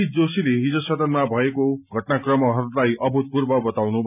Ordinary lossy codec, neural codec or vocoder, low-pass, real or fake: none; none; 3.6 kHz; real